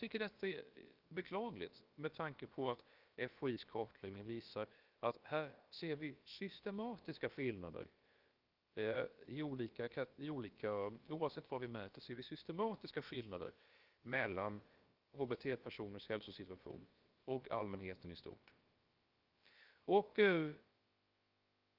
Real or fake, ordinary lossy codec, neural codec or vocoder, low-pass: fake; Opus, 24 kbps; codec, 16 kHz, about 1 kbps, DyCAST, with the encoder's durations; 5.4 kHz